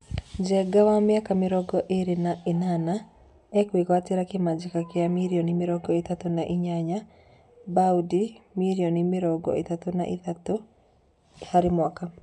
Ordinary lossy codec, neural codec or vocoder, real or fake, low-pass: none; none; real; 10.8 kHz